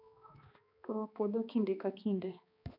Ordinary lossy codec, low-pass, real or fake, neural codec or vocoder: none; 5.4 kHz; fake; codec, 16 kHz, 2 kbps, X-Codec, HuBERT features, trained on balanced general audio